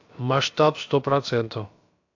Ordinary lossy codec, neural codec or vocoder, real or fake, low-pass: AAC, 48 kbps; codec, 16 kHz, about 1 kbps, DyCAST, with the encoder's durations; fake; 7.2 kHz